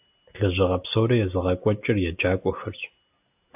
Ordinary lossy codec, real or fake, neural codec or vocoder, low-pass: AAC, 32 kbps; real; none; 3.6 kHz